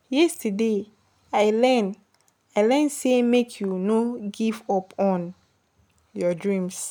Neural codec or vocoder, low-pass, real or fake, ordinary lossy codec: none; none; real; none